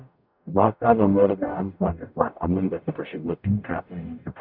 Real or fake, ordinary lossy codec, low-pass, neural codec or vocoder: fake; none; 5.4 kHz; codec, 44.1 kHz, 0.9 kbps, DAC